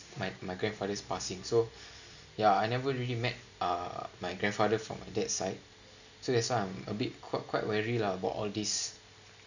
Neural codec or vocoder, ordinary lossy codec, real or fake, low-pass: none; none; real; 7.2 kHz